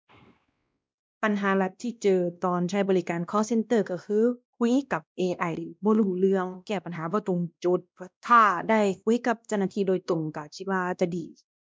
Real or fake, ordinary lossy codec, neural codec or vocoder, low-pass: fake; none; codec, 16 kHz, 1 kbps, X-Codec, WavLM features, trained on Multilingual LibriSpeech; none